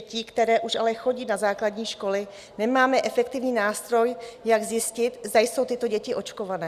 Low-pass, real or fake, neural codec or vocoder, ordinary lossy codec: 14.4 kHz; real; none; Opus, 64 kbps